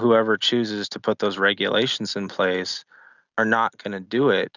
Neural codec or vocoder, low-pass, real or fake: none; 7.2 kHz; real